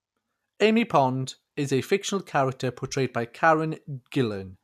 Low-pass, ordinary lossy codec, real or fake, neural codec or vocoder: 14.4 kHz; none; real; none